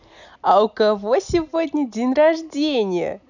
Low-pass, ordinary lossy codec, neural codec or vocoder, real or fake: 7.2 kHz; none; none; real